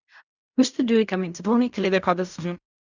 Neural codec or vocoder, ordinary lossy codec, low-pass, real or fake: codec, 16 kHz in and 24 kHz out, 0.4 kbps, LongCat-Audio-Codec, fine tuned four codebook decoder; Opus, 64 kbps; 7.2 kHz; fake